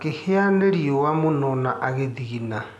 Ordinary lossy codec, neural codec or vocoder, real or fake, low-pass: none; none; real; none